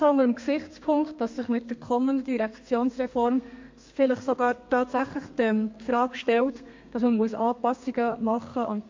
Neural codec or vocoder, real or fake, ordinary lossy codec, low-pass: codec, 32 kHz, 1.9 kbps, SNAC; fake; MP3, 48 kbps; 7.2 kHz